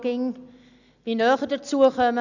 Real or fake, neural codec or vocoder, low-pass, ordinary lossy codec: real; none; 7.2 kHz; none